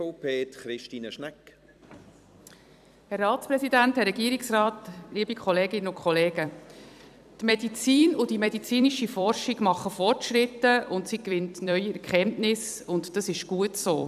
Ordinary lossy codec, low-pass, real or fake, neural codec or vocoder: none; 14.4 kHz; fake; vocoder, 44.1 kHz, 128 mel bands every 256 samples, BigVGAN v2